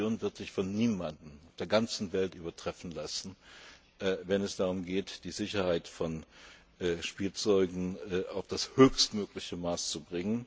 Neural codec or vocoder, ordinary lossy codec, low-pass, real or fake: none; none; none; real